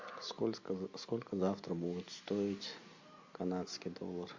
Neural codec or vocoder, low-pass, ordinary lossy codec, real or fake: none; 7.2 kHz; MP3, 48 kbps; real